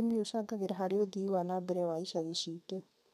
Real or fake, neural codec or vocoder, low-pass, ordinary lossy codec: fake; codec, 32 kHz, 1.9 kbps, SNAC; 14.4 kHz; none